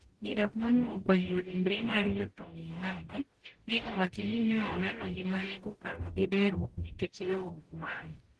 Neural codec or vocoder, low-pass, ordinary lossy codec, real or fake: codec, 44.1 kHz, 0.9 kbps, DAC; 10.8 kHz; Opus, 16 kbps; fake